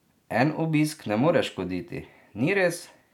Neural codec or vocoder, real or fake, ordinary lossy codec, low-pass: none; real; none; 19.8 kHz